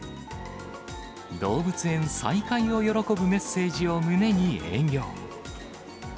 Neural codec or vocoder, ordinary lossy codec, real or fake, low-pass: none; none; real; none